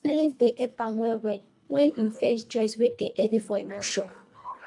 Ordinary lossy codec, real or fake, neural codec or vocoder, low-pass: AAC, 64 kbps; fake; codec, 24 kHz, 1.5 kbps, HILCodec; 10.8 kHz